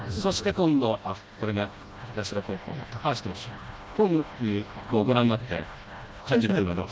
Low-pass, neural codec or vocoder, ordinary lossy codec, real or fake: none; codec, 16 kHz, 1 kbps, FreqCodec, smaller model; none; fake